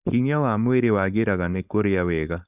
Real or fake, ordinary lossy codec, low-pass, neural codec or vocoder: fake; none; 3.6 kHz; codec, 16 kHz, 4.8 kbps, FACodec